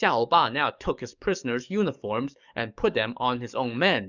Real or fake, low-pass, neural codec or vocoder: fake; 7.2 kHz; codec, 44.1 kHz, 7.8 kbps, DAC